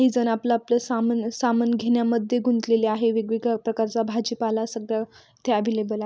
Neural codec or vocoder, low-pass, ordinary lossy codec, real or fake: none; none; none; real